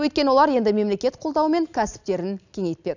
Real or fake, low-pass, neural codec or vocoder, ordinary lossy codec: real; 7.2 kHz; none; none